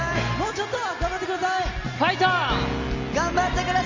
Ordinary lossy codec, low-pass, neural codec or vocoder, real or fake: Opus, 32 kbps; 7.2 kHz; none; real